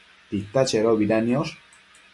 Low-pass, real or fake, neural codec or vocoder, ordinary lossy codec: 10.8 kHz; real; none; MP3, 96 kbps